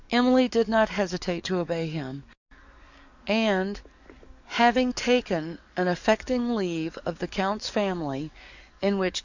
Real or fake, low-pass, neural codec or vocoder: fake; 7.2 kHz; codec, 44.1 kHz, 7.8 kbps, DAC